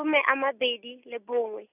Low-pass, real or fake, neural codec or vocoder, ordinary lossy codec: 3.6 kHz; real; none; none